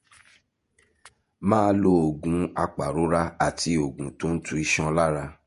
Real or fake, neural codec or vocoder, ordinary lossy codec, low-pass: fake; vocoder, 48 kHz, 128 mel bands, Vocos; MP3, 48 kbps; 14.4 kHz